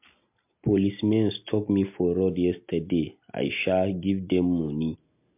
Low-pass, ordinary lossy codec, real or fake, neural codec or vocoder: 3.6 kHz; MP3, 32 kbps; real; none